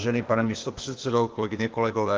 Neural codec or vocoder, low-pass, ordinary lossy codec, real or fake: codec, 16 kHz, 0.8 kbps, ZipCodec; 7.2 kHz; Opus, 32 kbps; fake